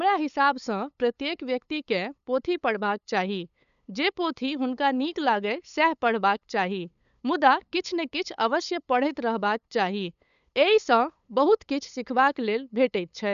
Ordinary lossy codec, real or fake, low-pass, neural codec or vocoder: none; fake; 7.2 kHz; codec, 16 kHz, 8 kbps, FunCodec, trained on LibriTTS, 25 frames a second